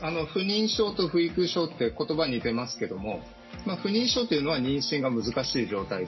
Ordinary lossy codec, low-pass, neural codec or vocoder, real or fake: MP3, 24 kbps; 7.2 kHz; none; real